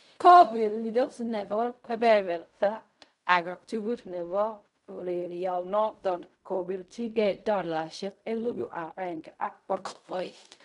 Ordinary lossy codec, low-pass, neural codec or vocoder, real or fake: none; 10.8 kHz; codec, 16 kHz in and 24 kHz out, 0.4 kbps, LongCat-Audio-Codec, fine tuned four codebook decoder; fake